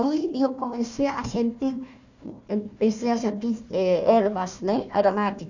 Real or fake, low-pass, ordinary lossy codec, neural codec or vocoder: fake; 7.2 kHz; none; codec, 16 kHz, 1 kbps, FunCodec, trained on Chinese and English, 50 frames a second